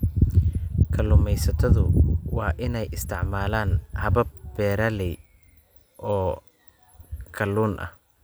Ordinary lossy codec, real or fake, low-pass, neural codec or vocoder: none; real; none; none